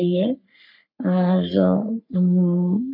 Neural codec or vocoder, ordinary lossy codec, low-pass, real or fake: codec, 44.1 kHz, 3.4 kbps, Pupu-Codec; none; 5.4 kHz; fake